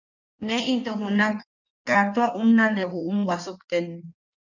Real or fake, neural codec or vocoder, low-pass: fake; codec, 16 kHz in and 24 kHz out, 1.1 kbps, FireRedTTS-2 codec; 7.2 kHz